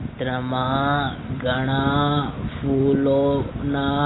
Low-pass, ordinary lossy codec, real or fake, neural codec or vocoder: 7.2 kHz; AAC, 16 kbps; real; none